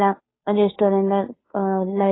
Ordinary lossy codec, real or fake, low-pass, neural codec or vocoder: AAC, 16 kbps; fake; 7.2 kHz; codec, 16 kHz, 2 kbps, FunCodec, trained on Chinese and English, 25 frames a second